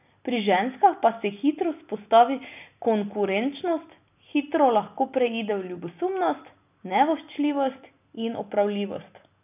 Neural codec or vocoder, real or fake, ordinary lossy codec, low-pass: none; real; none; 3.6 kHz